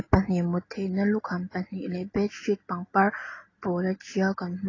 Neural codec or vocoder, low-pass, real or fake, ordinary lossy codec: none; 7.2 kHz; real; AAC, 32 kbps